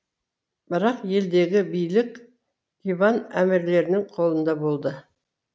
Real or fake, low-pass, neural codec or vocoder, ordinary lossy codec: real; none; none; none